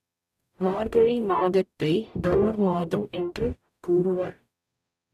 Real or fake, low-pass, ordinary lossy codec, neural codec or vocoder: fake; 14.4 kHz; none; codec, 44.1 kHz, 0.9 kbps, DAC